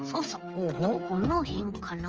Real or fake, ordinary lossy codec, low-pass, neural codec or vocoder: fake; Opus, 24 kbps; 7.2 kHz; codec, 16 kHz, 8 kbps, FreqCodec, smaller model